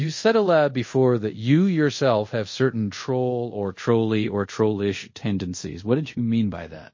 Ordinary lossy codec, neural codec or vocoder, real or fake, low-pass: MP3, 32 kbps; codec, 24 kHz, 0.5 kbps, DualCodec; fake; 7.2 kHz